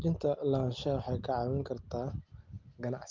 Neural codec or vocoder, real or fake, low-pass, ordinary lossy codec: none; real; 7.2 kHz; Opus, 24 kbps